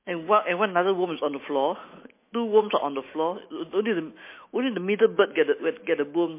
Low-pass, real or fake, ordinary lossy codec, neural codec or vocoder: 3.6 kHz; fake; MP3, 24 kbps; autoencoder, 48 kHz, 128 numbers a frame, DAC-VAE, trained on Japanese speech